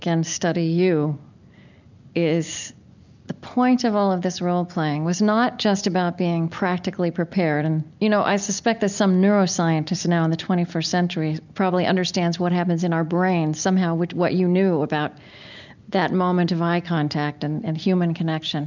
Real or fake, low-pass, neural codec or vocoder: real; 7.2 kHz; none